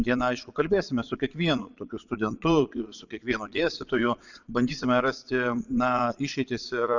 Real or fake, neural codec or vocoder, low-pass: fake; vocoder, 22.05 kHz, 80 mel bands, Vocos; 7.2 kHz